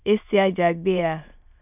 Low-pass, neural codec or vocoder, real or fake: 3.6 kHz; autoencoder, 22.05 kHz, a latent of 192 numbers a frame, VITS, trained on many speakers; fake